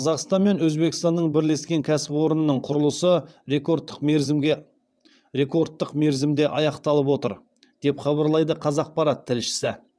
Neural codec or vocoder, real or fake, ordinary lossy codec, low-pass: vocoder, 22.05 kHz, 80 mel bands, WaveNeXt; fake; none; none